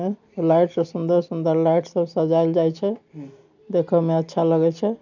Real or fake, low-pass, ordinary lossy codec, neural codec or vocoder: real; 7.2 kHz; none; none